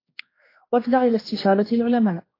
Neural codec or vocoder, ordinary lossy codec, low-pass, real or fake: codec, 16 kHz, 2 kbps, X-Codec, HuBERT features, trained on general audio; AAC, 24 kbps; 5.4 kHz; fake